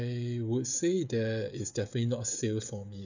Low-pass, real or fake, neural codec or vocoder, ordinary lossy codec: 7.2 kHz; fake; codec, 16 kHz, 16 kbps, FreqCodec, smaller model; none